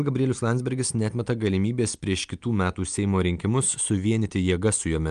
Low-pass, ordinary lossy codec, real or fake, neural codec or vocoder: 9.9 kHz; Opus, 32 kbps; real; none